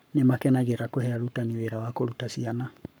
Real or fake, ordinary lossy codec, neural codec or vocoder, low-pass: fake; none; codec, 44.1 kHz, 7.8 kbps, Pupu-Codec; none